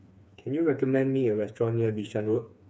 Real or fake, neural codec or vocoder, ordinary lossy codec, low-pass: fake; codec, 16 kHz, 4 kbps, FreqCodec, smaller model; none; none